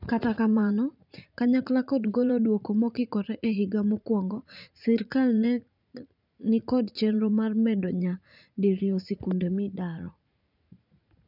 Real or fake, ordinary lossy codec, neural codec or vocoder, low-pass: fake; none; vocoder, 44.1 kHz, 80 mel bands, Vocos; 5.4 kHz